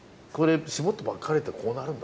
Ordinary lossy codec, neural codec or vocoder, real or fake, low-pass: none; none; real; none